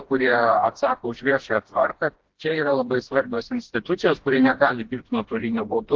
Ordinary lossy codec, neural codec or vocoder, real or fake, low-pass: Opus, 16 kbps; codec, 16 kHz, 1 kbps, FreqCodec, smaller model; fake; 7.2 kHz